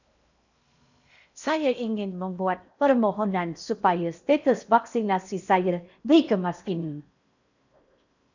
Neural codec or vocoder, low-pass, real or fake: codec, 16 kHz in and 24 kHz out, 0.8 kbps, FocalCodec, streaming, 65536 codes; 7.2 kHz; fake